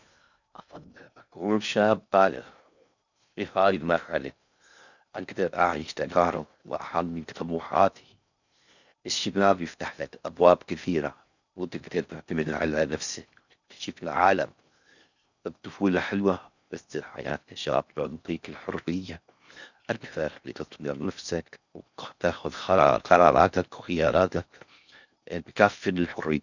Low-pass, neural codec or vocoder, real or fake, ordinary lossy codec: 7.2 kHz; codec, 16 kHz in and 24 kHz out, 0.6 kbps, FocalCodec, streaming, 2048 codes; fake; none